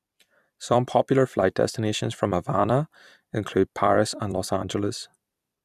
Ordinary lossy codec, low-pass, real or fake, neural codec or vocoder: none; 14.4 kHz; fake; vocoder, 44.1 kHz, 128 mel bands every 256 samples, BigVGAN v2